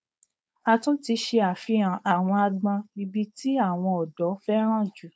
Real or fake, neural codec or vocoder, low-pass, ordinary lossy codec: fake; codec, 16 kHz, 4.8 kbps, FACodec; none; none